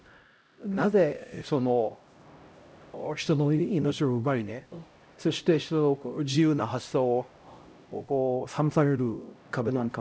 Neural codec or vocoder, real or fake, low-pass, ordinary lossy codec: codec, 16 kHz, 0.5 kbps, X-Codec, HuBERT features, trained on LibriSpeech; fake; none; none